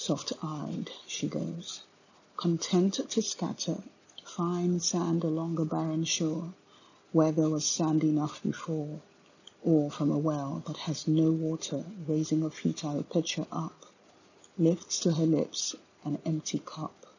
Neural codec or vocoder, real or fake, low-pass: none; real; 7.2 kHz